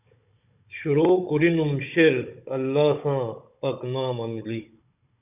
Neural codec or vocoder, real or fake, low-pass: codec, 16 kHz, 16 kbps, FunCodec, trained on Chinese and English, 50 frames a second; fake; 3.6 kHz